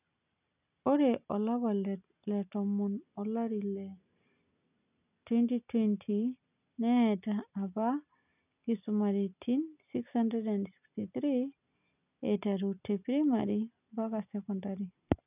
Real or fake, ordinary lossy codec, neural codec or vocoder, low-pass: real; none; none; 3.6 kHz